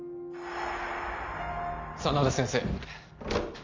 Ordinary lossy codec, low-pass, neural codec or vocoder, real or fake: Opus, 32 kbps; 7.2 kHz; none; real